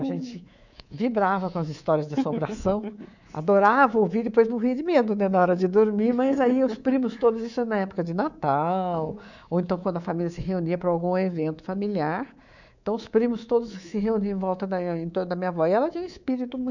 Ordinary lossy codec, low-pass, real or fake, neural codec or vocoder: none; 7.2 kHz; fake; codec, 24 kHz, 3.1 kbps, DualCodec